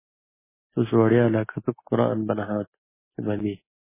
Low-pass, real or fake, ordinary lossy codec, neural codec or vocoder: 3.6 kHz; real; MP3, 16 kbps; none